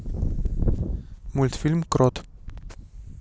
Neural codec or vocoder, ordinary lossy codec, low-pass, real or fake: none; none; none; real